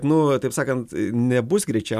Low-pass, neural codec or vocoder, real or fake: 14.4 kHz; none; real